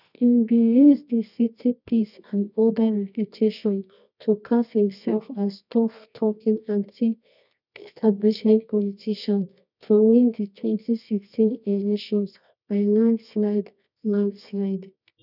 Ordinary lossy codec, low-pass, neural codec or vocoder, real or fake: none; 5.4 kHz; codec, 24 kHz, 0.9 kbps, WavTokenizer, medium music audio release; fake